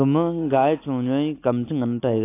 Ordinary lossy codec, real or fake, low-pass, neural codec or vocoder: AAC, 24 kbps; real; 3.6 kHz; none